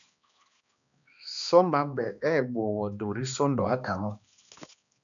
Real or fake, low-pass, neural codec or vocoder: fake; 7.2 kHz; codec, 16 kHz, 2 kbps, X-Codec, HuBERT features, trained on balanced general audio